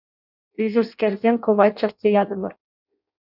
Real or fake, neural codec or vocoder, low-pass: fake; codec, 16 kHz in and 24 kHz out, 0.6 kbps, FireRedTTS-2 codec; 5.4 kHz